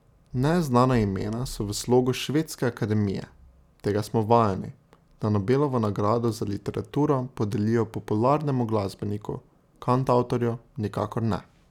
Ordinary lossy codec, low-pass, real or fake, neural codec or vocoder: none; 19.8 kHz; real; none